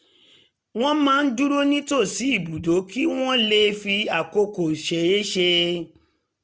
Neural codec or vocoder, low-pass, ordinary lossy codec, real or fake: none; none; none; real